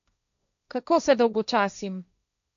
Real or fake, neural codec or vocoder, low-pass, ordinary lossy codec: fake; codec, 16 kHz, 1.1 kbps, Voila-Tokenizer; 7.2 kHz; none